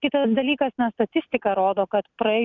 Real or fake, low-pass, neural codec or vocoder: real; 7.2 kHz; none